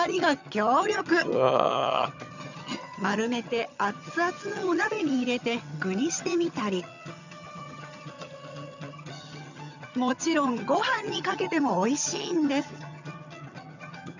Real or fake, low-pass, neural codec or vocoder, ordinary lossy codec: fake; 7.2 kHz; vocoder, 22.05 kHz, 80 mel bands, HiFi-GAN; none